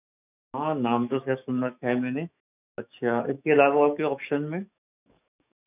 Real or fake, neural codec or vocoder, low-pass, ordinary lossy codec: fake; autoencoder, 48 kHz, 128 numbers a frame, DAC-VAE, trained on Japanese speech; 3.6 kHz; none